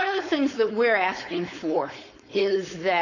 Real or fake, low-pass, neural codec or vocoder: fake; 7.2 kHz; codec, 16 kHz, 4.8 kbps, FACodec